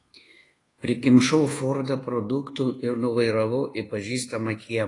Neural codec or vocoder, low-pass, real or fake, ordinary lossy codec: codec, 24 kHz, 1.2 kbps, DualCodec; 10.8 kHz; fake; AAC, 32 kbps